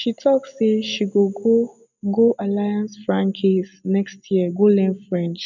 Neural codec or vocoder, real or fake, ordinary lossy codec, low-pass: none; real; none; 7.2 kHz